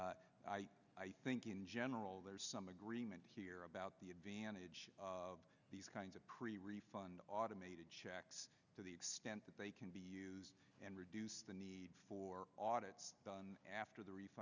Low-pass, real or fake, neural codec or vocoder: 7.2 kHz; real; none